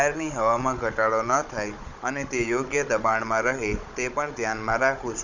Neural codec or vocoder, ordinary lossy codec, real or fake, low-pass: codec, 16 kHz, 16 kbps, FunCodec, trained on Chinese and English, 50 frames a second; none; fake; 7.2 kHz